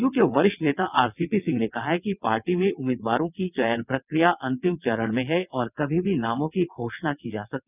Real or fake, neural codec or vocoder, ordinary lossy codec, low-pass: fake; vocoder, 22.05 kHz, 80 mel bands, WaveNeXt; none; 3.6 kHz